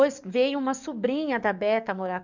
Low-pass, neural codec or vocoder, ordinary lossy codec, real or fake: 7.2 kHz; codec, 44.1 kHz, 7.8 kbps, DAC; none; fake